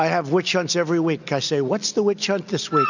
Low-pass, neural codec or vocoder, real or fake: 7.2 kHz; none; real